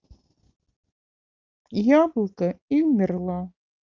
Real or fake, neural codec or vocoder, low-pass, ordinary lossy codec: real; none; 7.2 kHz; Opus, 32 kbps